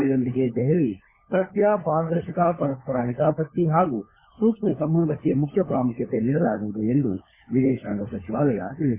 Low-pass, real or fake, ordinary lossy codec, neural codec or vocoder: 3.6 kHz; fake; MP3, 16 kbps; codec, 16 kHz, 4 kbps, FunCodec, trained on LibriTTS, 50 frames a second